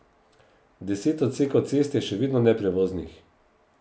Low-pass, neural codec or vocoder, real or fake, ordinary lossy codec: none; none; real; none